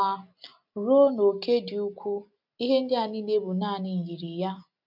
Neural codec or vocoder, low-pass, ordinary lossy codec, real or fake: none; 5.4 kHz; none; real